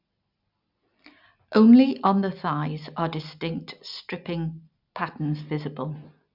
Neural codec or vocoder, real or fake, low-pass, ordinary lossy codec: none; real; 5.4 kHz; none